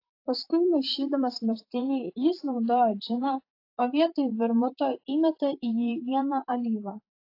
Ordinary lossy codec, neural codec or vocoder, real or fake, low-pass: AAC, 32 kbps; vocoder, 44.1 kHz, 128 mel bands, Pupu-Vocoder; fake; 5.4 kHz